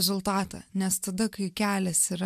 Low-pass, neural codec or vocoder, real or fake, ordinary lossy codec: 14.4 kHz; none; real; MP3, 96 kbps